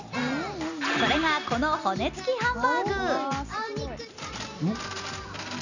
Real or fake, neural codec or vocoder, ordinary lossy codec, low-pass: real; none; none; 7.2 kHz